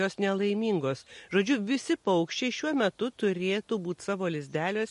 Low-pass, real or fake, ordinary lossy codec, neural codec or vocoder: 14.4 kHz; real; MP3, 48 kbps; none